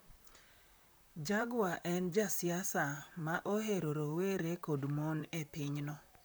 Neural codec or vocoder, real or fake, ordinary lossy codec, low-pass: vocoder, 44.1 kHz, 128 mel bands, Pupu-Vocoder; fake; none; none